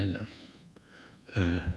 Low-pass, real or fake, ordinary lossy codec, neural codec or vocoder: none; fake; none; codec, 24 kHz, 1.2 kbps, DualCodec